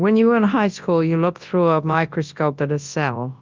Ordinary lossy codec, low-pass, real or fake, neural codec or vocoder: Opus, 24 kbps; 7.2 kHz; fake; codec, 24 kHz, 0.9 kbps, WavTokenizer, large speech release